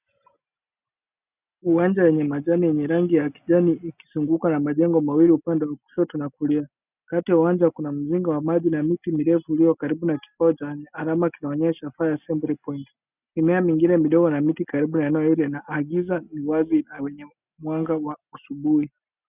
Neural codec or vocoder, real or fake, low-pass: none; real; 3.6 kHz